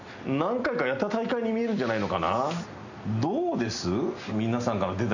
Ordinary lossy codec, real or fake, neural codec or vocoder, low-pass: none; real; none; 7.2 kHz